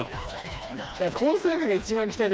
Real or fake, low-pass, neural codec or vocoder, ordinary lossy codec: fake; none; codec, 16 kHz, 2 kbps, FreqCodec, smaller model; none